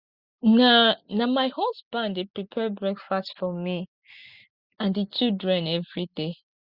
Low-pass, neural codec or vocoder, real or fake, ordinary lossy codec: 5.4 kHz; none; real; Opus, 64 kbps